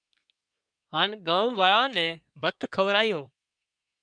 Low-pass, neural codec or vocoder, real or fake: 9.9 kHz; codec, 24 kHz, 1 kbps, SNAC; fake